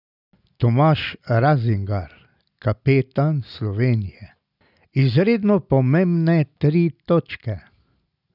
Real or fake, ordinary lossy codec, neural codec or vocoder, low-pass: real; none; none; 5.4 kHz